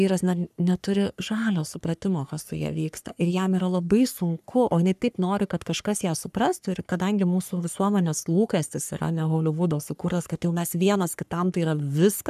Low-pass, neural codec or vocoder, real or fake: 14.4 kHz; codec, 44.1 kHz, 3.4 kbps, Pupu-Codec; fake